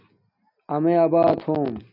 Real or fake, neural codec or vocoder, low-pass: real; none; 5.4 kHz